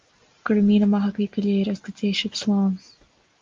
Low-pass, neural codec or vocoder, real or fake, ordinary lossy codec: 7.2 kHz; none; real; Opus, 32 kbps